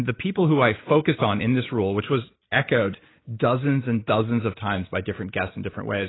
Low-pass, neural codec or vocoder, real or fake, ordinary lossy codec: 7.2 kHz; none; real; AAC, 16 kbps